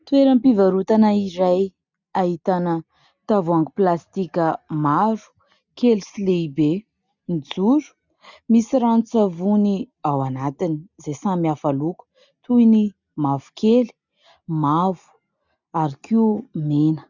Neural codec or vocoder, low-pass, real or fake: none; 7.2 kHz; real